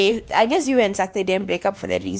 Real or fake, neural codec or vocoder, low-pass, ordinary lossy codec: fake; codec, 16 kHz, 2 kbps, X-Codec, WavLM features, trained on Multilingual LibriSpeech; none; none